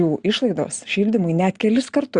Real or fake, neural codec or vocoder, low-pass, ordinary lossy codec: real; none; 9.9 kHz; Opus, 64 kbps